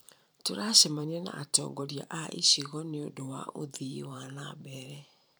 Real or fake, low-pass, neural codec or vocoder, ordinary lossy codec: fake; none; vocoder, 44.1 kHz, 128 mel bands every 512 samples, BigVGAN v2; none